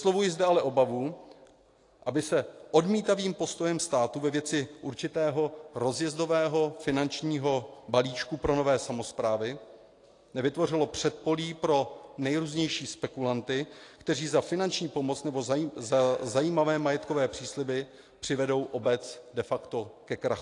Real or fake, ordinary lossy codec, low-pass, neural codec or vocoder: real; AAC, 48 kbps; 10.8 kHz; none